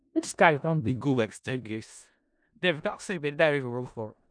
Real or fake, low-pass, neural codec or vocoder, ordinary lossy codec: fake; 9.9 kHz; codec, 16 kHz in and 24 kHz out, 0.4 kbps, LongCat-Audio-Codec, four codebook decoder; none